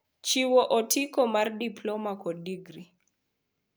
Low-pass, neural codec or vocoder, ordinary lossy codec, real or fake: none; none; none; real